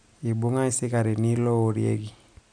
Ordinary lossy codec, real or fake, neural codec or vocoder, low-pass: none; real; none; 9.9 kHz